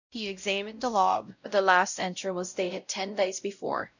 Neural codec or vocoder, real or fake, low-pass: codec, 16 kHz, 0.5 kbps, X-Codec, WavLM features, trained on Multilingual LibriSpeech; fake; 7.2 kHz